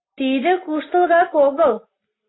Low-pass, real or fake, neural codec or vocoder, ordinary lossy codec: 7.2 kHz; real; none; AAC, 16 kbps